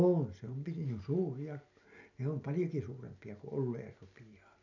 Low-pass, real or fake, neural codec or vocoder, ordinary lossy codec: 7.2 kHz; real; none; none